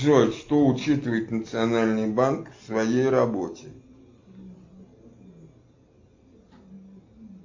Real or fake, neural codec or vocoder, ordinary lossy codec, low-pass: real; none; MP3, 48 kbps; 7.2 kHz